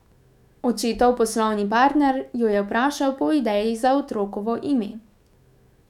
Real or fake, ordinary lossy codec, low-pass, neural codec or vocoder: fake; none; 19.8 kHz; autoencoder, 48 kHz, 128 numbers a frame, DAC-VAE, trained on Japanese speech